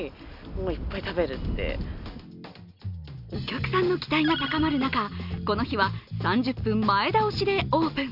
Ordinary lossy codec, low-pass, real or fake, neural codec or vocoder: none; 5.4 kHz; real; none